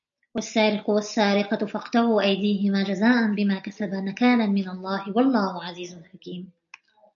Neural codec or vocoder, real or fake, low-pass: none; real; 7.2 kHz